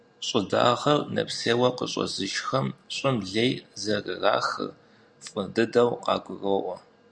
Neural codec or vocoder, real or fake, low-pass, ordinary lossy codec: none; real; 9.9 kHz; Opus, 64 kbps